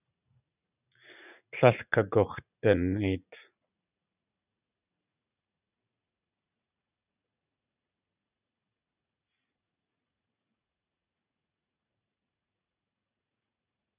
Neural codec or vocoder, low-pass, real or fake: vocoder, 44.1 kHz, 80 mel bands, Vocos; 3.6 kHz; fake